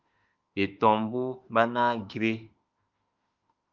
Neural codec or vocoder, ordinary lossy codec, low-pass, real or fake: autoencoder, 48 kHz, 32 numbers a frame, DAC-VAE, trained on Japanese speech; Opus, 24 kbps; 7.2 kHz; fake